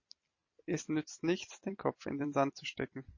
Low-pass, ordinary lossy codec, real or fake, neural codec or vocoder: 7.2 kHz; MP3, 32 kbps; fake; vocoder, 44.1 kHz, 128 mel bands every 256 samples, BigVGAN v2